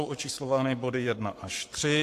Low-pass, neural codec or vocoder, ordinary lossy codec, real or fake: 14.4 kHz; codec, 44.1 kHz, 7.8 kbps, Pupu-Codec; AAC, 64 kbps; fake